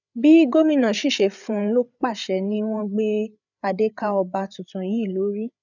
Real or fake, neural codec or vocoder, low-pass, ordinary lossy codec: fake; codec, 16 kHz, 8 kbps, FreqCodec, larger model; 7.2 kHz; none